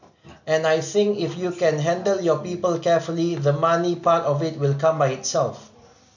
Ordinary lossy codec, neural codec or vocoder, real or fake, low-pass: none; none; real; 7.2 kHz